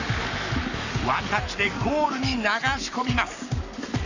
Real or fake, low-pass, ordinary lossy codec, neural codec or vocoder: fake; 7.2 kHz; none; codec, 44.1 kHz, 7.8 kbps, Pupu-Codec